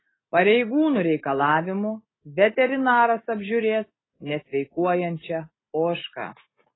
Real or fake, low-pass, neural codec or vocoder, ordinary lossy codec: real; 7.2 kHz; none; AAC, 16 kbps